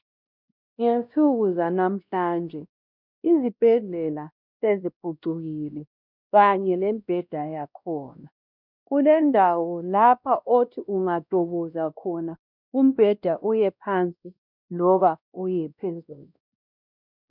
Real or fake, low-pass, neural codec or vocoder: fake; 5.4 kHz; codec, 16 kHz, 1 kbps, X-Codec, WavLM features, trained on Multilingual LibriSpeech